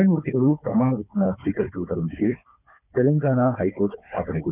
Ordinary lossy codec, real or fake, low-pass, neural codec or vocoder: none; fake; 3.6 kHz; codec, 16 kHz, 4 kbps, FunCodec, trained on Chinese and English, 50 frames a second